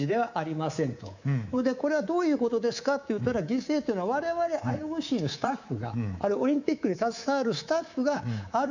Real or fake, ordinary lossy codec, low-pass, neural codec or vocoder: fake; none; 7.2 kHz; codec, 24 kHz, 3.1 kbps, DualCodec